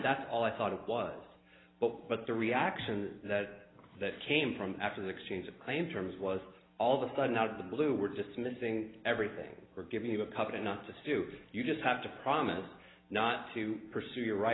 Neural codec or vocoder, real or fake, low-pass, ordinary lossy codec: none; real; 7.2 kHz; AAC, 16 kbps